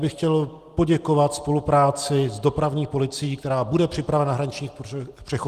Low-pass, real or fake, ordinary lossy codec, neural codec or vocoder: 14.4 kHz; real; Opus, 24 kbps; none